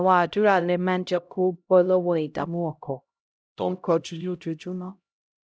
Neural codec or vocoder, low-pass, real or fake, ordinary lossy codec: codec, 16 kHz, 0.5 kbps, X-Codec, HuBERT features, trained on LibriSpeech; none; fake; none